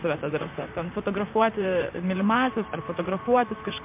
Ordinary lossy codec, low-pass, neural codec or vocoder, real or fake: MP3, 24 kbps; 3.6 kHz; vocoder, 44.1 kHz, 128 mel bands, Pupu-Vocoder; fake